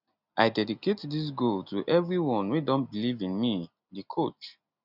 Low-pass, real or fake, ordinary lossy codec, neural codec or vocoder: 5.4 kHz; real; none; none